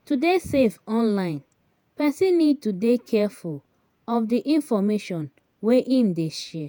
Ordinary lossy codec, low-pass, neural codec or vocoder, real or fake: none; none; vocoder, 48 kHz, 128 mel bands, Vocos; fake